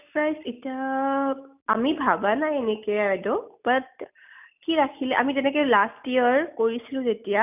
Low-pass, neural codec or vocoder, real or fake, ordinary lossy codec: 3.6 kHz; none; real; none